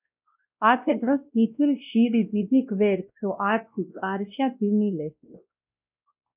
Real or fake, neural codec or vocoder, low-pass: fake; codec, 16 kHz, 1 kbps, X-Codec, WavLM features, trained on Multilingual LibriSpeech; 3.6 kHz